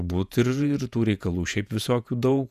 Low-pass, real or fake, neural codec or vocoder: 14.4 kHz; fake; vocoder, 48 kHz, 128 mel bands, Vocos